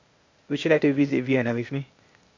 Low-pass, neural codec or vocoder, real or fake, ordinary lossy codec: 7.2 kHz; codec, 16 kHz, 0.8 kbps, ZipCodec; fake; MP3, 48 kbps